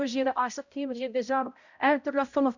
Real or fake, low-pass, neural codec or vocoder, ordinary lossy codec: fake; 7.2 kHz; codec, 16 kHz, 0.5 kbps, X-Codec, HuBERT features, trained on balanced general audio; none